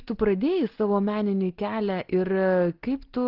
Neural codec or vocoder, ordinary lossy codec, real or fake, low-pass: none; Opus, 16 kbps; real; 5.4 kHz